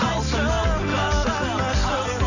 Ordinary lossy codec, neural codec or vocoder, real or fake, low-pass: none; none; real; 7.2 kHz